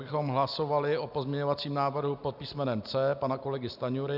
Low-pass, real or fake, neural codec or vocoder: 5.4 kHz; real; none